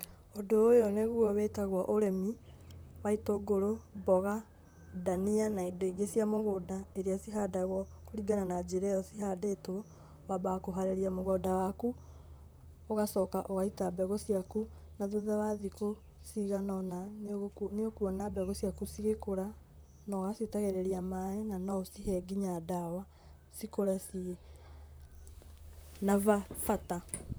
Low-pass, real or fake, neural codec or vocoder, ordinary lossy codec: none; fake; vocoder, 44.1 kHz, 128 mel bands, Pupu-Vocoder; none